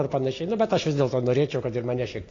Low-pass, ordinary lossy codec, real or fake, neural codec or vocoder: 7.2 kHz; AAC, 32 kbps; real; none